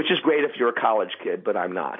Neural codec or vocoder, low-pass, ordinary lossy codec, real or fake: none; 7.2 kHz; MP3, 24 kbps; real